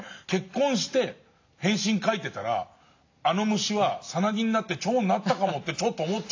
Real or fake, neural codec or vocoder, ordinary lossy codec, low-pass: real; none; AAC, 48 kbps; 7.2 kHz